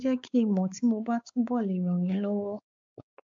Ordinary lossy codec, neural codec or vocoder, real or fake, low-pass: AAC, 64 kbps; codec, 16 kHz, 4 kbps, X-Codec, WavLM features, trained on Multilingual LibriSpeech; fake; 7.2 kHz